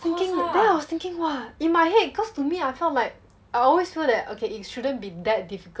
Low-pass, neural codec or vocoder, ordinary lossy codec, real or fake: none; none; none; real